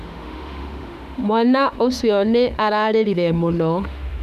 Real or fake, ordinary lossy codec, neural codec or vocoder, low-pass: fake; none; autoencoder, 48 kHz, 32 numbers a frame, DAC-VAE, trained on Japanese speech; 14.4 kHz